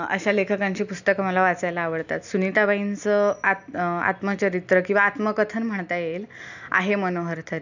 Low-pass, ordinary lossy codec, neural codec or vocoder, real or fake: 7.2 kHz; none; none; real